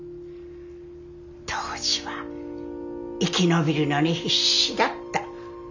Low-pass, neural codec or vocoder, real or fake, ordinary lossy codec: 7.2 kHz; none; real; none